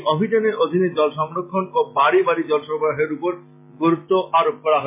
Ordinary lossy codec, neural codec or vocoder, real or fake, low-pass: MP3, 24 kbps; none; real; 3.6 kHz